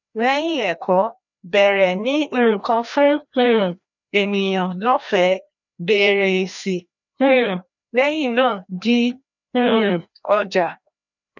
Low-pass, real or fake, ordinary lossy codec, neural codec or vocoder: 7.2 kHz; fake; none; codec, 16 kHz, 1 kbps, FreqCodec, larger model